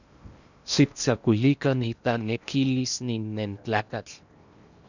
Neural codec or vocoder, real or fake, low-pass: codec, 16 kHz in and 24 kHz out, 0.8 kbps, FocalCodec, streaming, 65536 codes; fake; 7.2 kHz